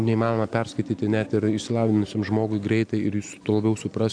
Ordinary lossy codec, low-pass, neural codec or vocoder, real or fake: AAC, 64 kbps; 9.9 kHz; none; real